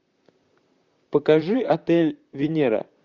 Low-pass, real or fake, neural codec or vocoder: 7.2 kHz; fake; vocoder, 44.1 kHz, 128 mel bands, Pupu-Vocoder